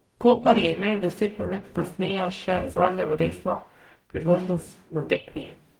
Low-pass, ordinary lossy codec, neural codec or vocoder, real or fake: 19.8 kHz; Opus, 24 kbps; codec, 44.1 kHz, 0.9 kbps, DAC; fake